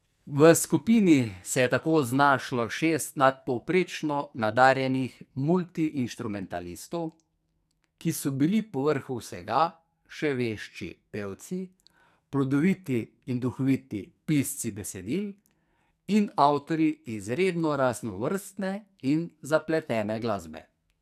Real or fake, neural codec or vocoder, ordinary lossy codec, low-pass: fake; codec, 44.1 kHz, 2.6 kbps, SNAC; none; 14.4 kHz